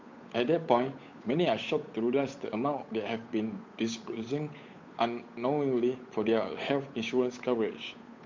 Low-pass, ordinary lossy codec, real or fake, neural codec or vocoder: 7.2 kHz; MP3, 48 kbps; fake; codec, 16 kHz, 8 kbps, FunCodec, trained on Chinese and English, 25 frames a second